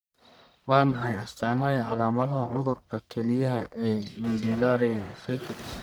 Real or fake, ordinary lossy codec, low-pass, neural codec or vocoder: fake; none; none; codec, 44.1 kHz, 1.7 kbps, Pupu-Codec